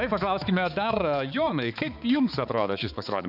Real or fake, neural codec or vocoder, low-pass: fake; codec, 16 kHz, 4 kbps, X-Codec, HuBERT features, trained on balanced general audio; 5.4 kHz